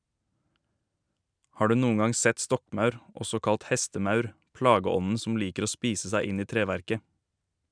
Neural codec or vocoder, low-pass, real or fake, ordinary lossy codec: none; 9.9 kHz; real; none